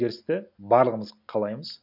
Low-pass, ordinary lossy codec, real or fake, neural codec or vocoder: 5.4 kHz; none; real; none